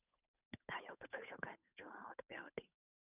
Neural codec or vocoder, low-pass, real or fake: codec, 16 kHz, 8 kbps, FunCodec, trained on Chinese and English, 25 frames a second; 3.6 kHz; fake